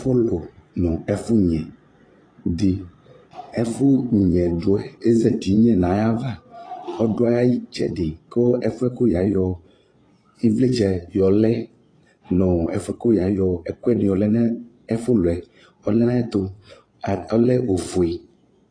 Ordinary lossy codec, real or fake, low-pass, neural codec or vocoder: AAC, 32 kbps; fake; 9.9 kHz; vocoder, 22.05 kHz, 80 mel bands, Vocos